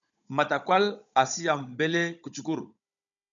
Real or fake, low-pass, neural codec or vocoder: fake; 7.2 kHz; codec, 16 kHz, 16 kbps, FunCodec, trained on Chinese and English, 50 frames a second